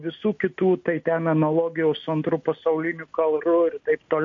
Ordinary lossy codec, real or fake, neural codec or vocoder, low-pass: MP3, 48 kbps; real; none; 7.2 kHz